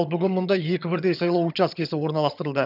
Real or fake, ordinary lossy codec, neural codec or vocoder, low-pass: fake; none; vocoder, 22.05 kHz, 80 mel bands, HiFi-GAN; 5.4 kHz